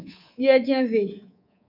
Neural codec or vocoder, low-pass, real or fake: codec, 24 kHz, 3.1 kbps, DualCodec; 5.4 kHz; fake